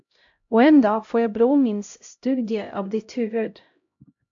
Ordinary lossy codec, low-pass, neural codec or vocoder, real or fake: AAC, 64 kbps; 7.2 kHz; codec, 16 kHz, 0.5 kbps, X-Codec, HuBERT features, trained on LibriSpeech; fake